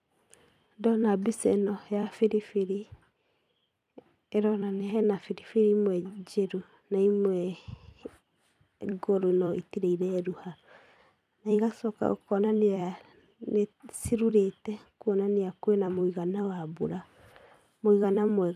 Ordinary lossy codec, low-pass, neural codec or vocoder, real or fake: none; 14.4 kHz; vocoder, 44.1 kHz, 128 mel bands every 256 samples, BigVGAN v2; fake